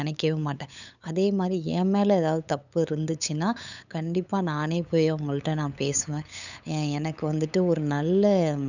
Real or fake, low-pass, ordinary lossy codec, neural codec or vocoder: fake; 7.2 kHz; none; codec, 16 kHz, 8 kbps, FunCodec, trained on LibriTTS, 25 frames a second